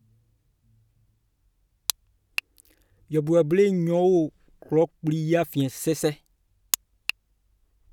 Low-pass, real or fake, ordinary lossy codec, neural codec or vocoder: 19.8 kHz; real; none; none